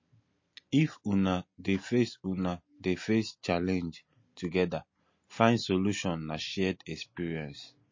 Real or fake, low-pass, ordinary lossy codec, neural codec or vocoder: real; 7.2 kHz; MP3, 32 kbps; none